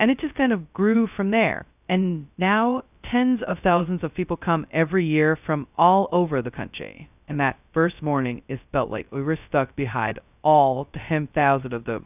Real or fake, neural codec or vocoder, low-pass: fake; codec, 16 kHz, 0.2 kbps, FocalCodec; 3.6 kHz